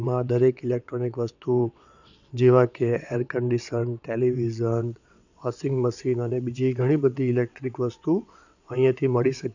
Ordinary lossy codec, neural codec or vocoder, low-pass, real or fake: none; vocoder, 22.05 kHz, 80 mel bands, WaveNeXt; 7.2 kHz; fake